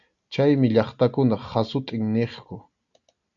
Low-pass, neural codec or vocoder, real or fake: 7.2 kHz; none; real